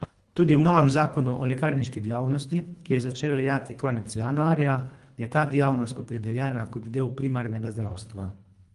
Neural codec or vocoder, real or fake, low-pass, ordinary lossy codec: codec, 24 kHz, 1.5 kbps, HILCodec; fake; 10.8 kHz; Opus, 32 kbps